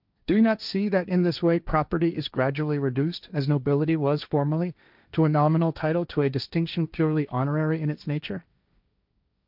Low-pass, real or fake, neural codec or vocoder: 5.4 kHz; fake; codec, 16 kHz, 1.1 kbps, Voila-Tokenizer